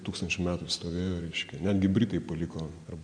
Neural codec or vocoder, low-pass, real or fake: none; 9.9 kHz; real